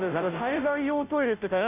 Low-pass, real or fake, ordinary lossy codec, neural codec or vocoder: 3.6 kHz; fake; none; codec, 16 kHz, 0.5 kbps, FunCodec, trained on Chinese and English, 25 frames a second